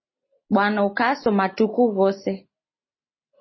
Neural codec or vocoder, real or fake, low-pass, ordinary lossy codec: none; real; 7.2 kHz; MP3, 24 kbps